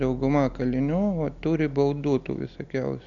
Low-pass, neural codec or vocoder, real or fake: 7.2 kHz; none; real